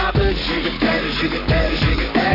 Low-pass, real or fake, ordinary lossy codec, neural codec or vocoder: 5.4 kHz; fake; none; codec, 44.1 kHz, 7.8 kbps, Pupu-Codec